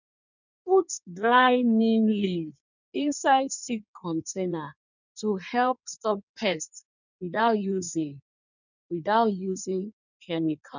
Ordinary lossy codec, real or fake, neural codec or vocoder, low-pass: none; fake; codec, 16 kHz in and 24 kHz out, 1.1 kbps, FireRedTTS-2 codec; 7.2 kHz